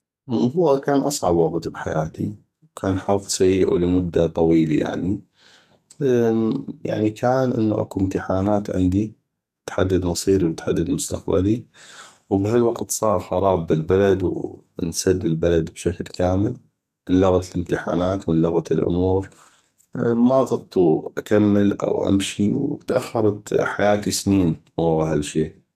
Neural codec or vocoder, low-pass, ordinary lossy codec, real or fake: codec, 44.1 kHz, 2.6 kbps, SNAC; 14.4 kHz; none; fake